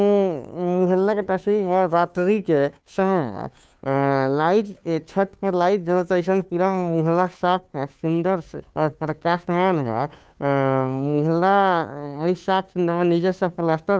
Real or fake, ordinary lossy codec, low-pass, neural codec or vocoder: fake; none; none; codec, 16 kHz, 2 kbps, FunCodec, trained on Chinese and English, 25 frames a second